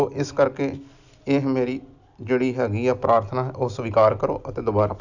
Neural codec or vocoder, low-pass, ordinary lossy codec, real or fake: none; 7.2 kHz; none; real